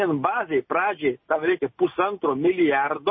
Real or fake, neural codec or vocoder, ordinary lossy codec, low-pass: real; none; MP3, 24 kbps; 7.2 kHz